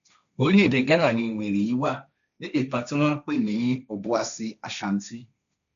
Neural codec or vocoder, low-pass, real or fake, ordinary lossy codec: codec, 16 kHz, 1.1 kbps, Voila-Tokenizer; 7.2 kHz; fake; none